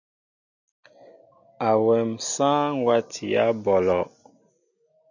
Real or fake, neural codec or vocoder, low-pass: real; none; 7.2 kHz